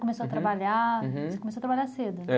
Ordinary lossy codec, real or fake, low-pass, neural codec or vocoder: none; real; none; none